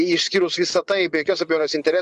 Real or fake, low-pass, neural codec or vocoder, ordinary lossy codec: fake; 10.8 kHz; vocoder, 24 kHz, 100 mel bands, Vocos; Opus, 64 kbps